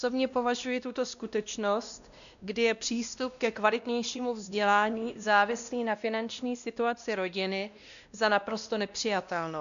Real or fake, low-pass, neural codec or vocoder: fake; 7.2 kHz; codec, 16 kHz, 1 kbps, X-Codec, WavLM features, trained on Multilingual LibriSpeech